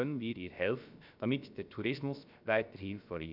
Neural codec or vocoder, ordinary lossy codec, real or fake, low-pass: codec, 16 kHz, about 1 kbps, DyCAST, with the encoder's durations; none; fake; 5.4 kHz